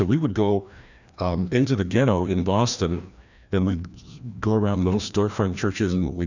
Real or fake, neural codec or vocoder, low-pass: fake; codec, 16 kHz, 1 kbps, FreqCodec, larger model; 7.2 kHz